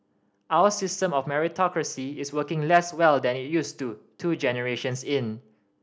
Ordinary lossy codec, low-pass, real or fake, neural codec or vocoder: none; none; real; none